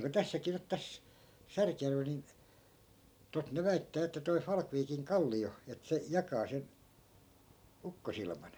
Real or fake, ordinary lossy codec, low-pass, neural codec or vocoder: real; none; none; none